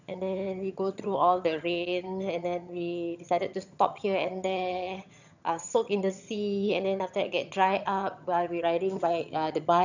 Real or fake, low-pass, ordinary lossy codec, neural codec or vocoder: fake; 7.2 kHz; none; vocoder, 22.05 kHz, 80 mel bands, HiFi-GAN